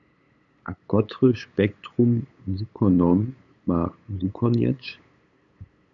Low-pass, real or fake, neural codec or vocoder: 7.2 kHz; fake; codec, 16 kHz, 8 kbps, FunCodec, trained on LibriTTS, 25 frames a second